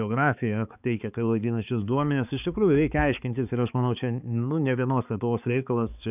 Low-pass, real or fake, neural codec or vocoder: 3.6 kHz; fake; codec, 16 kHz, 4 kbps, X-Codec, HuBERT features, trained on balanced general audio